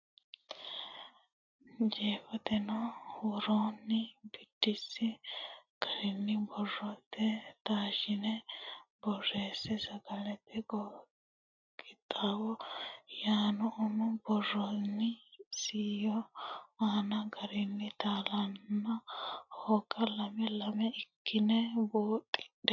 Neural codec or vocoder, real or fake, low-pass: none; real; 7.2 kHz